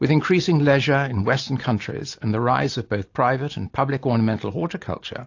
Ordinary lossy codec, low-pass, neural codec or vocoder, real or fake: AAC, 48 kbps; 7.2 kHz; none; real